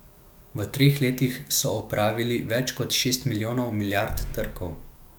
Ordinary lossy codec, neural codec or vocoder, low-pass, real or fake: none; codec, 44.1 kHz, 7.8 kbps, DAC; none; fake